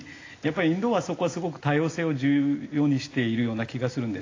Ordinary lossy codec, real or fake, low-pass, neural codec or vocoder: AAC, 32 kbps; real; 7.2 kHz; none